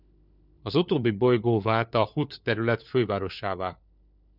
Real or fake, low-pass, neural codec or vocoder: fake; 5.4 kHz; codec, 16 kHz, 4 kbps, FunCodec, trained on LibriTTS, 50 frames a second